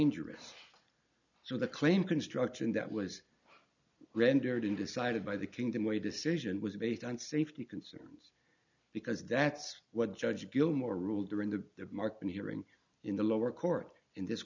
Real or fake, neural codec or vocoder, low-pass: real; none; 7.2 kHz